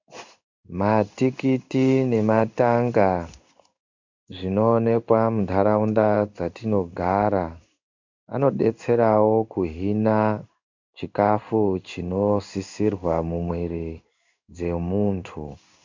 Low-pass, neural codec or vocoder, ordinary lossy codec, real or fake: 7.2 kHz; codec, 16 kHz in and 24 kHz out, 1 kbps, XY-Tokenizer; MP3, 64 kbps; fake